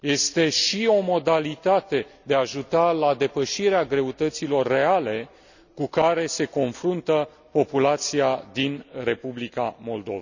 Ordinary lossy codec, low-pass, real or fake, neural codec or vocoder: none; 7.2 kHz; real; none